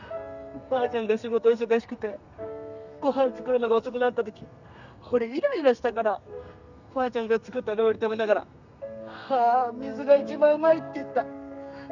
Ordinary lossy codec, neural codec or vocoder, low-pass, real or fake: none; codec, 32 kHz, 1.9 kbps, SNAC; 7.2 kHz; fake